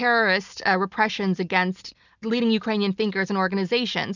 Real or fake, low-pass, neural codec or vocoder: real; 7.2 kHz; none